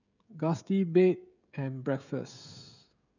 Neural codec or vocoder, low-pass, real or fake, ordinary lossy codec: codec, 16 kHz, 16 kbps, FreqCodec, smaller model; 7.2 kHz; fake; none